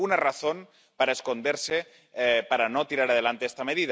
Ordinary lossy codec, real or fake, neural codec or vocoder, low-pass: none; real; none; none